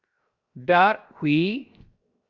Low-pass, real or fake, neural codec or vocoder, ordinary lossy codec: 7.2 kHz; fake; codec, 16 kHz, 0.7 kbps, FocalCodec; Opus, 64 kbps